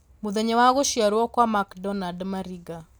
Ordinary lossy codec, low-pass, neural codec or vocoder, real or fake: none; none; none; real